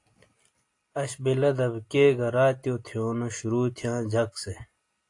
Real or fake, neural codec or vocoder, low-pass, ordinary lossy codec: real; none; 10.8 kHz; AAC, 48 kbps